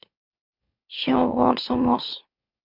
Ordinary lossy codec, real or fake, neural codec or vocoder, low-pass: MP3, 48 kbps; fake; autoencoder, 44.1 kHz, a latent of 192 numbers a frame, MeloTTS; 5.4 kHz